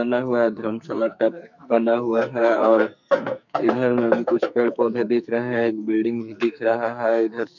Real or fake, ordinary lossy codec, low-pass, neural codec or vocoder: fake; none; 7.2 kHz; codec, 44.1 kHz, 2.6 kbps, SNAC